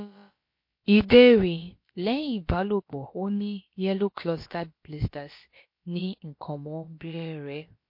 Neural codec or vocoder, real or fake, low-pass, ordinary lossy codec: codec, 16 kHz, about 1 kbps, DyCAST, with the encoder's durations; fake; 5.4 kHz; MP3, 32 kbps